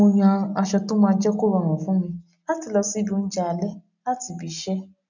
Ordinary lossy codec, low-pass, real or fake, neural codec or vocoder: none; 7.2 kHz; real; none